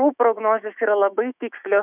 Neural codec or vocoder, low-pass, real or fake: autoencoder, 48 kHz, 128 numbers a frame, DAC-VAE, trained on Japanese speech; 3.6 kHz; fake